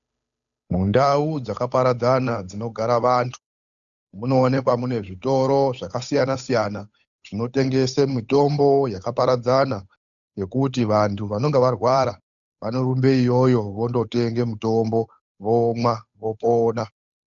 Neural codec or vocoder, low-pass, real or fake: codec, 16 kHz, 8 kbps, FunCodec, trained on Chinese and English, 25 frames a second; 7.2 kHz; fake